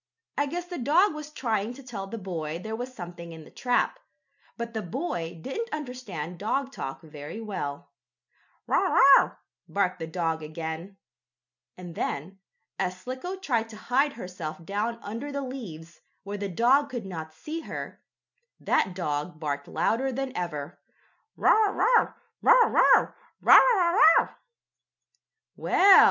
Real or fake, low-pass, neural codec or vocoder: real; 7.2 kHz; none